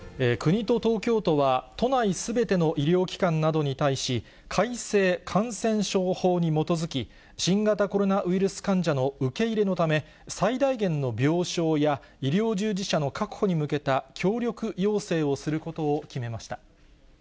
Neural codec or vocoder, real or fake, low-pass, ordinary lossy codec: none; real; none; none